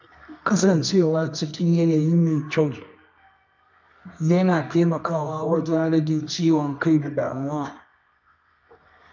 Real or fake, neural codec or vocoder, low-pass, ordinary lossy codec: fake; codec, 24 kHz, 0.9 kbps, WavTokenizer, medium music audio release; 7.2 kHz; MP3, 64 kbps